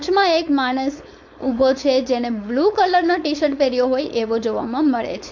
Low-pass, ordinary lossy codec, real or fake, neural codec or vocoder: 7.2 kHz; MP3, 48 kbps; fake; codec, 16 kHz, 4.8 kbps, FACodec